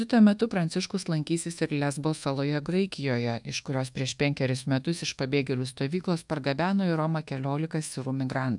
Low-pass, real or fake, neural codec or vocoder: 10.8 kHz; fake; codec, 24 kHz, 1.2 kbps, DualCodec